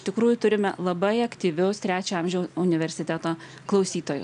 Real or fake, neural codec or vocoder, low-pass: real; none; 9.9 kHz